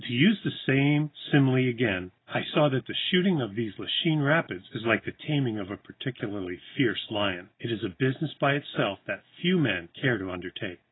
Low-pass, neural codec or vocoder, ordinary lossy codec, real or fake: 7.2 kHz; none; AAC, 16 kbps; real